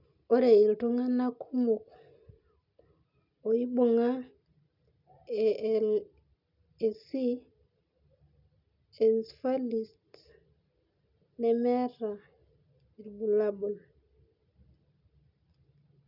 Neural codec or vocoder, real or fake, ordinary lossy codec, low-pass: none; real; none; 5.4 kHz